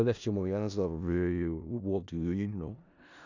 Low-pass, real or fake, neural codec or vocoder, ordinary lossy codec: 7.2 kHz; fake; codec, 16 kHz in and 24 kHz out, 0.4 kbps, LongCat-Audio-Codec, four codebook decoder; none